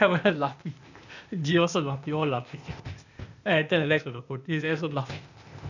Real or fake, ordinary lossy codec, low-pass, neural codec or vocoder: fake; none; 7.2 kHz; codec, 16 kHz, 0.8 kbps, ZipCodec